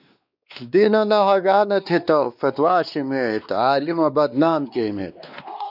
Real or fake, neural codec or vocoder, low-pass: fake; codec, 16 kHz, 2 kbps, X-Codec, WavLM features, trained on Multilingual LibriSpeech; 5.4 kHz